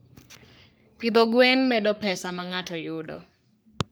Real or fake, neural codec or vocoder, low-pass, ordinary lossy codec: fake; codec, 44.1 kHz, 3.4 kbps, Pupu-Codec; none; none